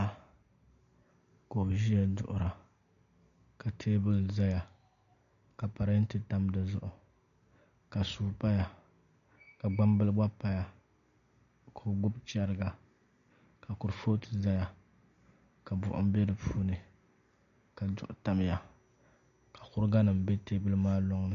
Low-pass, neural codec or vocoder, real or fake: 7.2 kHz; none; real